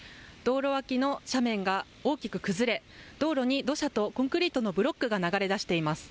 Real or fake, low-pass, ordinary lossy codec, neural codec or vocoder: real; none; none; none